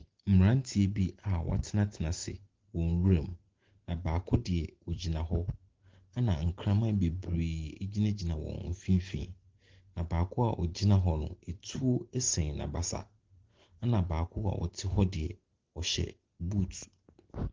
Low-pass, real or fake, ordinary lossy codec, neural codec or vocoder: 7.2 kHz; real; Opus, 16 kbps; none